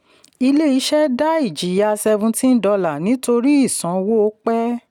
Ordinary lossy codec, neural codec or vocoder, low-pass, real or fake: none; none; 19.8 kHz; real